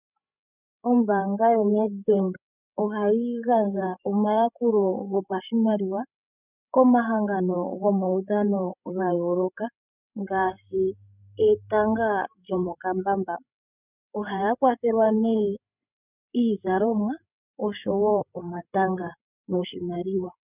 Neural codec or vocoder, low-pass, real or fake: codec, 16 kHz, 16 kbps, FreqCodec, larger model; 3.6 kHz; fake